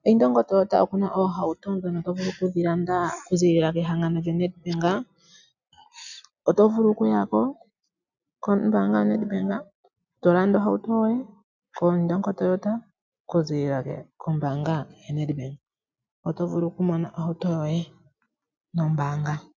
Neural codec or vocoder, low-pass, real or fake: none; 7.2 kHz; real